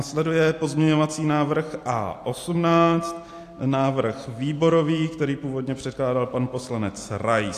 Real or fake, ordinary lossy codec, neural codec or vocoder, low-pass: real; AAC, 64 kbps; none; 14.4 kHz